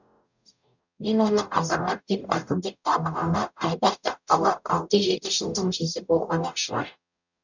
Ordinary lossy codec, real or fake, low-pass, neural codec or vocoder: none; fake; 7.2 kHz; codec, 44.1 kHz, 0.9 kbps, DAC